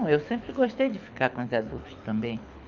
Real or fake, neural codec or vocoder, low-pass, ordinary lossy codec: fake; codec, 24 kHz, 6 kbps, HILCodec; 7.2 kHz; none